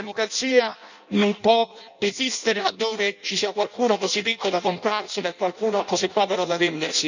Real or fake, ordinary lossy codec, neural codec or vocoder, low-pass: fake; none; codec, 16 kHz in and 24 kHz out, 0.6 kbps, FireRedTTS-2 codec; 7.2 kHz